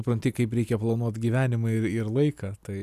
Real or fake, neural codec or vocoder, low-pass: real; none; 14.4 kHz